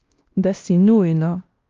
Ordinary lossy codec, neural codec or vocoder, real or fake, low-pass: Opus, 32 kbps; codec, 16 kHz, 1 kbps, X-Codec, WavLM features, trained on Multilingual LibriSpeech; fake; 7.2 kHz